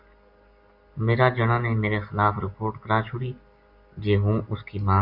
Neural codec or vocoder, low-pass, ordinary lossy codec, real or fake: none; 5.4 kHz; AAC, 48 kbps; real